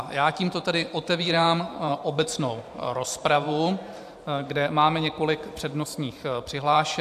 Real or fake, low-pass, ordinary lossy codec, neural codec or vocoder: fake; 14.4 kHz; AAC, 96 kbps; vocoder, 44.1 kHz, 128 mel bands every 512 samples, BigVGAN v2